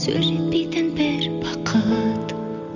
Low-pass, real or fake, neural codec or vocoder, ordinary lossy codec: 7.2 kHz; real; none; none